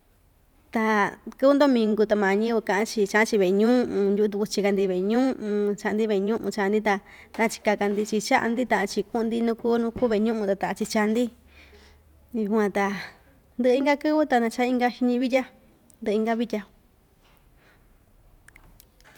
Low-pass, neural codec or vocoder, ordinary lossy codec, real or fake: 19.8 kHz; vocoder, 44.1 kHz, 128 mel bands every 512 samples, BigVGAN v2; none; fake